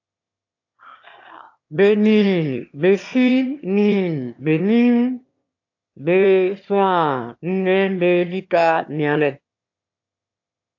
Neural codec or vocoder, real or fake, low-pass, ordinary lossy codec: autoencoder, 22.05 kHz, a latent of 192 numbers a frame, VITS, trained on one speaker; fake; 7.2 kHz; AAC, 48 kbps